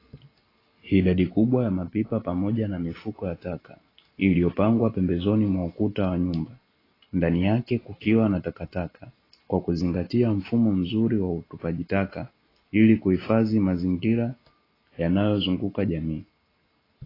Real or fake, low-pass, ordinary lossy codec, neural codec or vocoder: real; 5.4 kHz; AAC, 24 kbps; none